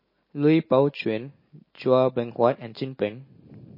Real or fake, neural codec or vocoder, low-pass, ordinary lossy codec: real; none; 5.4 kHz; MP3, 24 kbps